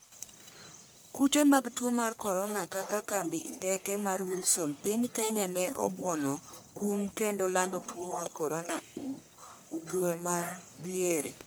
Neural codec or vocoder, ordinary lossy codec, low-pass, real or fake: codec, 44.1 kHz, 1.7 kbps, Pupu-Codec; none; none; fake